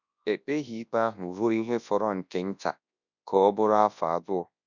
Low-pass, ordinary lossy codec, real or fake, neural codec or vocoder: 7.2 kHz; none; fake; codec, 24 kHz, 0.9 kbps, WavTokenizer, large speech release